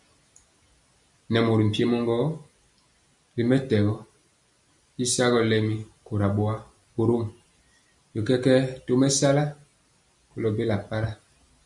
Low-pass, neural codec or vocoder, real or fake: 10.8 kHz; none; real